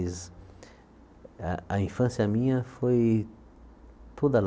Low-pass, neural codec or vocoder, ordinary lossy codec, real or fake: none; none; none; real